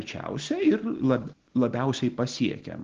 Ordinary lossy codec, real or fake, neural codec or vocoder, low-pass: Opus, 16 kbps; real; none; 7.2 kHz